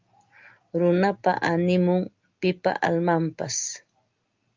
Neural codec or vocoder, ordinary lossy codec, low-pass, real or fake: none; Opus, 32 kbps; 7.2 kHz; real